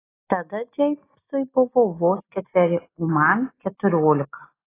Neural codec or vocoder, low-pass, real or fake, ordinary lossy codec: none; 3.6 kHz; real; AAC, 16 kbps